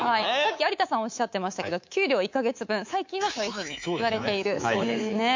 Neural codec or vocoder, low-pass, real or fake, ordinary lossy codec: codec, 24 kHz, 3.1 kbps, DualCodec; 7.2 kHz; fake; MP3, 64 kbps